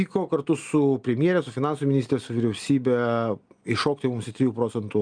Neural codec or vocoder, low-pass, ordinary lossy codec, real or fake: none; 9.9 kHz; Opus, 32 kbps; real